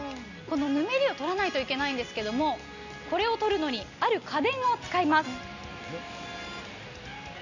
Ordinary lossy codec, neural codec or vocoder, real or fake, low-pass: none; none; real; 7.2 kHz